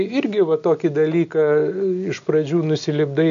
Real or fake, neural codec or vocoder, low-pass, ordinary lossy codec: real; none; 7.2 kHz; AAC, 96 kbps